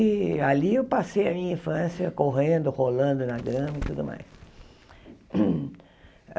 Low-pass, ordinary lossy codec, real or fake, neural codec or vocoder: none; none; real; none